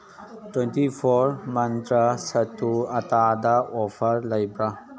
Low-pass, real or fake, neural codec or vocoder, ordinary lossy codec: none; real; none; none